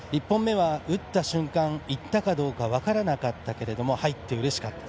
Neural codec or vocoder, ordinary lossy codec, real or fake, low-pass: none; none; real; none